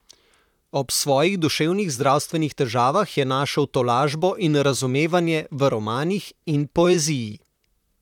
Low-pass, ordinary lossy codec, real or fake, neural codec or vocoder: 19.8 kHz; none; fake; vocoder, 44.1 kHz, 128 mel bands, Pupu-Vocoder